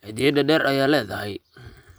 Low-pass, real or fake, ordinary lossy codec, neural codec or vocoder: none; real; none; none